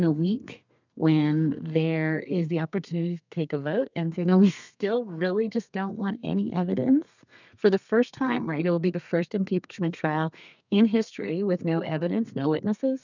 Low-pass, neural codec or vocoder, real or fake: 7.2 kHz; codec, 32 kHz, 1.9 kbps, SNAC; fake